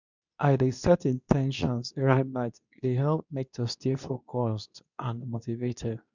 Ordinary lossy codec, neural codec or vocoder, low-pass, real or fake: none; codec, 24 kHz, 0.9 kbps, WavTokenizer, medium speech release version 2; 7.2 kHz; fake